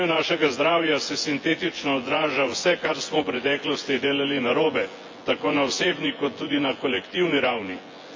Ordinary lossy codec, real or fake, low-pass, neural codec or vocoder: MP3, 48 kbps; fake; 7.2 kHz; vocoder, 24 kHz, 100 mel bands, Vocos